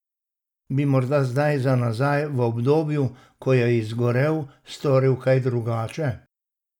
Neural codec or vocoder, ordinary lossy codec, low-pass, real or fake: none; none; 19.8 kHz; real